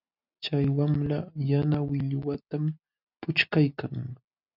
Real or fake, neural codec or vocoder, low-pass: real; none; 5.4 kHz